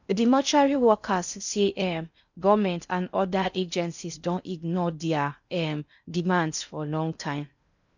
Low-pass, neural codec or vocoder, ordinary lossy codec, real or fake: 7.2 kHz; codec, 16 kHz in and 24 kHz out, 0.6 kbps, FocalCodec, streaming, 4096 codes; none; fake